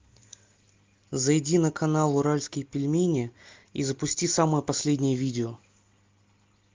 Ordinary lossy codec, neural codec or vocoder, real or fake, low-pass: Opus, 24 kbps; none; real; 7.2 kHz